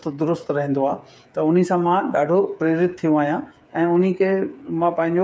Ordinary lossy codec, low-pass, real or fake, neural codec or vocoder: none; none; fake; codec, 16 kHz, 8 kbps, FreqCodec, smaller model